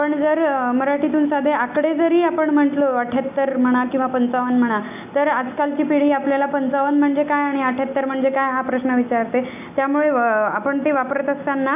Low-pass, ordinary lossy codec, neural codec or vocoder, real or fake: 3.6 kHz; none; none; real